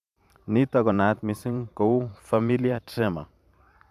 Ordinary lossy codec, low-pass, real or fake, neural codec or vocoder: none; 14.4 kHz; fake; vocoder, 44.1 kHz, 128 mel bands every 512 samples, BigVGAN v2